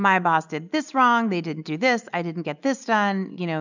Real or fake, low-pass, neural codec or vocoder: real; 7.2 kHz; none